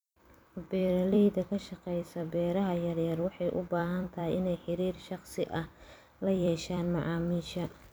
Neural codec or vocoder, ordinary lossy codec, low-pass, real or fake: vocoder, 44.1 kHz, 128 mel bands every 256 samples, BigVGAN v2; none; none; fake